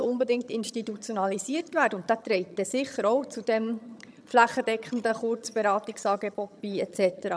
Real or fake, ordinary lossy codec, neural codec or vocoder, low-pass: fake; none; vocoder, 22.05 kHz, 80 mel bands, HiFi-GAN; none